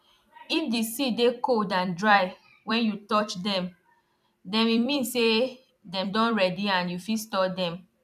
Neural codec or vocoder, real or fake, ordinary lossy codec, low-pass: vocoder, 44.1 kHz, 128 mel bands every 256 samples, BigVGAN v2; fake; none; 14.4 kHz